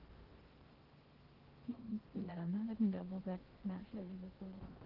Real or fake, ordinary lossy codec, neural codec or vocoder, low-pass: fake; Opus, 16 kbps; codec, 16 kHz in and 24 kHz out, 0.6 kbps, FocalCodec, streaming, 4096 codes; 5.4 kHz